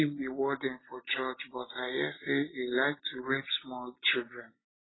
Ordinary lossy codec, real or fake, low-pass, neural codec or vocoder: AAC, 16 kbps; real; 7.2 kHz; none